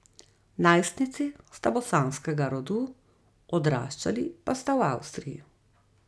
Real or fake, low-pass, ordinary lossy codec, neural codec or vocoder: real; none; none; none